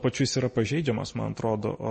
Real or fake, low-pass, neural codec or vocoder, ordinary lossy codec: real; 10.8 kHz; none; MP3, 32 kbps